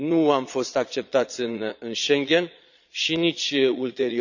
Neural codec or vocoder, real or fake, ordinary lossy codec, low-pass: vocoder, 22.05 kHz, 80 mel bands, Vocos; fake; none; 7.2 kHz